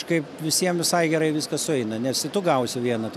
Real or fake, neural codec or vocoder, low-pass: real; none; 14.4 kHz